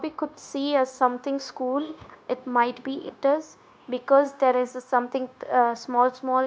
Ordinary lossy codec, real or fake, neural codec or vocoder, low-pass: none; fake; codec, 16 kHz, 0.9 kbps, LongCat-Audio-Codec; none